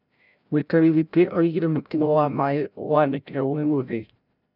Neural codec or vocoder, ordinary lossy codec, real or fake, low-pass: codec, 16 kHz, 0.5 kbps, FreqCodec, larger model; none; fake; 5.4 kHz